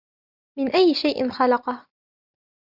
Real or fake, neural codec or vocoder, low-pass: real; none; 5.4 kHz